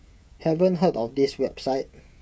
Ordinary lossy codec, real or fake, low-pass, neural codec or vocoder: none; fake; none; codec, 16 kHz, 16 kbps, FreqCodec, smaller model